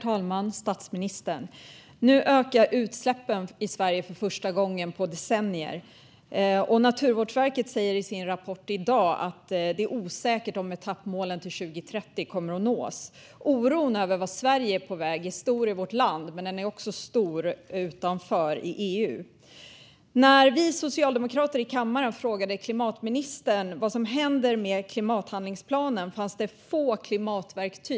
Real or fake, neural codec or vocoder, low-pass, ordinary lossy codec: real; none; none; none